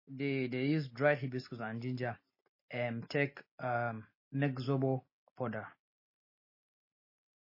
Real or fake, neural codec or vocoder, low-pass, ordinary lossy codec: real; none; 5.4 kHz; MP3, 24 kbps